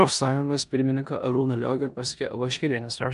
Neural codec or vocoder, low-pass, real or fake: codec, 16 kHz in and 24 kHz out, 0.9 kbps, LongCat-Audio-Codec, four codebook decoder; 10.8 kHz; fake